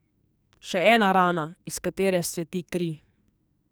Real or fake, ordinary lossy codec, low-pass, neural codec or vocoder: fake; none; none; codec, 44.1 kHz, 2.6 kbps, SNAC